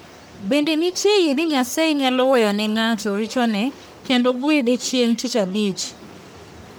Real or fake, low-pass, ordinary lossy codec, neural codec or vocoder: fake; none; none; codec, 44.1 kHz, 1.7 kbps, Pupu-Codec